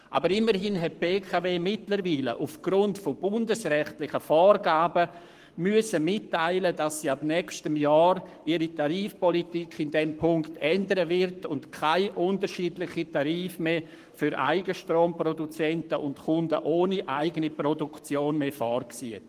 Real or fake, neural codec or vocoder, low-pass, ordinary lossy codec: fake; codec, 44.1 kHz, 7.8 kbps, Pupu-Codec; 14.4 kHz; Opus, 24 kbps